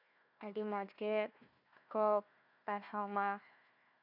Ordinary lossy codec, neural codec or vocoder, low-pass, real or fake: none; codec, 16 kHz, 1 kbps, FunCodec, trained on Chinese and English, 50 frames a second; 5.4 kHz; fake